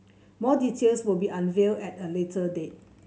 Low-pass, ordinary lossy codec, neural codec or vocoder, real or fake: none; none; none; real